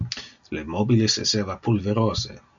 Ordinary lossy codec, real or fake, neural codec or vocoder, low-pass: MP3, 64 kbps; real; none; 7.2 kHz